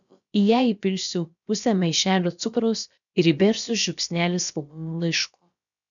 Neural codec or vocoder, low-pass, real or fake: codec, 16 kHz, about 1 kbps, DyCAST, with the encoder's durations; 7.2 kHz; fake